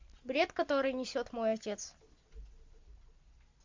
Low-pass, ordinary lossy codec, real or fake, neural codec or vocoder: 7.2 kHz; MP3, 64 kbps; real; none